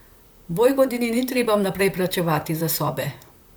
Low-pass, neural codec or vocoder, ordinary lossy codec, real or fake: none; vocoder, 44.1 kHz, 128 mel bands, Pupu-Vocoder; none; fake